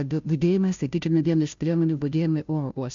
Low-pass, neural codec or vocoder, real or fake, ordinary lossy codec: 7.2 kHz; codec, 16 kHz, 0.5 kbps, FunCodec, trained on Chinese and English, 25 frames a second; fake; MP3, 64 kbps